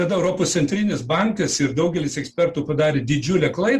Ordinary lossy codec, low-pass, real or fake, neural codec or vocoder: Opus, 24 kbps; 14.4 kHz; real; none